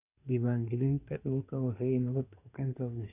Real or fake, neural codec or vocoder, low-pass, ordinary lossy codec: fake; codec, 24 kHz, 1 kbps, SNAC; 3.6 kHz; none